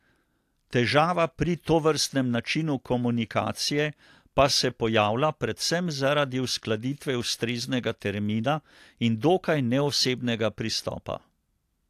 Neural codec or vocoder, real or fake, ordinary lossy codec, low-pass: none; real; AAC, 64 kbps; 14.4 kHz